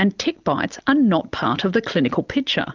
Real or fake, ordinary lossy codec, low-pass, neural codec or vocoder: real; Opus, 24 kbps; 7.2 kHz; none